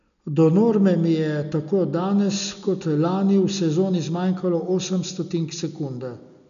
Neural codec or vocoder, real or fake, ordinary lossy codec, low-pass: none; real; none; 7.2 kHz